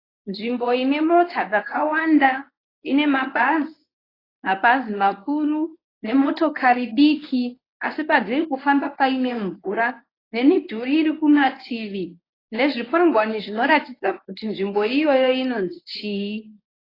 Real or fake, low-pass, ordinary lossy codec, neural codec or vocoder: fake; 5.4 kHz; AAC, 24 kbps; codec, 24 kHz, 0.9 kbps, WavTokenizer, medium speech release version 1